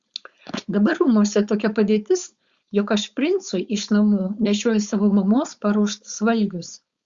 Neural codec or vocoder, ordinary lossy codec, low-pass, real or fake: codec, 16 kHz, 4.8 kbps, FACodec; Opus, 64 kbps; 7.2 kHz; fake